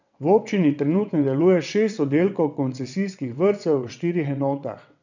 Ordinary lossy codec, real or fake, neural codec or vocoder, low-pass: none; fake; vocoder, 22.05 kHz, 80 mel bands, WaveNeXt; 7.2 kHz